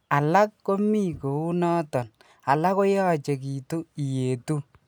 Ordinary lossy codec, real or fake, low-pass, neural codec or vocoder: none; real; none; none